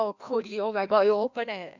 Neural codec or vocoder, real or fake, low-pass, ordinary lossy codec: codec, 16 kHz, 1 kbps, FreqCodec, larger model; fake; 7.2 kHz; none